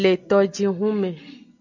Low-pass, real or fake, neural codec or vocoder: 7.2 kHz; real; none